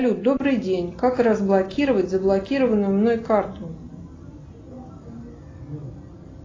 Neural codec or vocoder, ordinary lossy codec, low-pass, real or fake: none; AAC, 32 kbps; 7.2 kHz; real